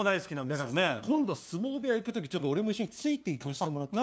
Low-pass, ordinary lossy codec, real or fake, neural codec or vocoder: none; none; fake; codec, 16 kHz, 2 kbps, FunCodec, trained on LibriTTS, 25 frames a second